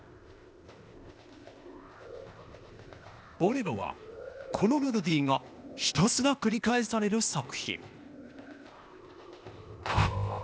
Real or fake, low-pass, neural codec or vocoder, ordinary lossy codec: fake; none; codec, 16 kHz, 0.8 kbps, ZipCodec; none